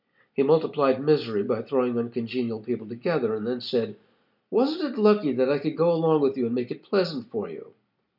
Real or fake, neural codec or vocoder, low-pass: real; none; 5.4 kHz